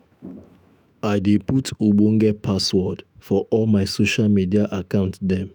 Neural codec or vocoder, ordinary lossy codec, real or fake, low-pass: autoencoder, 48 kHz, 128 numbers a frame, DAC-VAE, trained on Japanese speech; none; fake; none